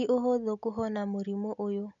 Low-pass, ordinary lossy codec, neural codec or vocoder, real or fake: 7.2 kHz; none; none; real